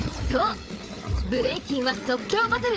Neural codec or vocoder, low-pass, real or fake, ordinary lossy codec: codec, 16 kHz, 8 kbps, FreqCodec, larger model; none; fake; none